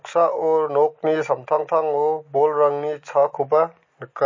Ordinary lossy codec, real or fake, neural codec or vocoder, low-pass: MP3, 32 kbps; real; none; 7.2 kHz